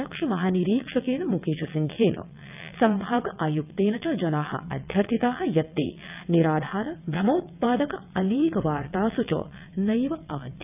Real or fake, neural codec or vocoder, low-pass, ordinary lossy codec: fake; vocoder, 22.05 kHz, 80 mel bands, WaveNeXt; 3.6 kHz; none